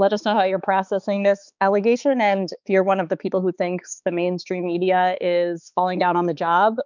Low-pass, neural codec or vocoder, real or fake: 7.2 kHz; codec, 16 kHz, 4 kbps, X-Codec, HuBERT features, trained on balanced general audio; fake